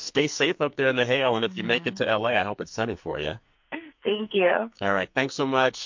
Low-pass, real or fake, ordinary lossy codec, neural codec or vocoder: 7.2 kHz; fake; MP3, 48 kbps; codec, 44.1 kHz, 2.6 kbps, SNAC